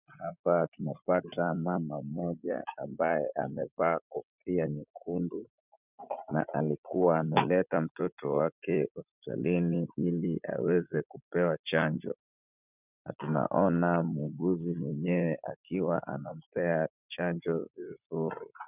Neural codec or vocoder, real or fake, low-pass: vocoder, 44.1 kHz, 80 mel bands, Vocos; fake; 3.6 kHz